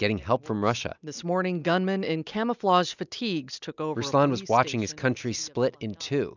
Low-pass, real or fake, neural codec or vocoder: 7.2 kHz; real; none